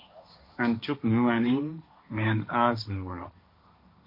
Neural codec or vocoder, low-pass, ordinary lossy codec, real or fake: codec, 16 kHz, 1.1 kbps, Voila-Tokenizer; 5.4 kHz; MP3, 32 kbps; fake